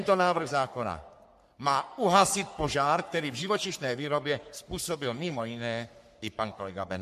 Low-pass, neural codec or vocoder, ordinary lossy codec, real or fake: 14.4 kHz; codec, 44.1 kHz, 3.4 kbps, Pupu-Codec; MP3, 64 kbps; fake